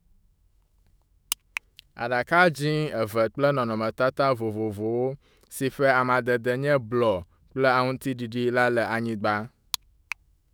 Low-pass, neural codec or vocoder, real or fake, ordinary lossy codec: none; autoencoder, 48 kHz, 128 numbers a frame, DAC-VAE, trained on Japanese speech; fake; none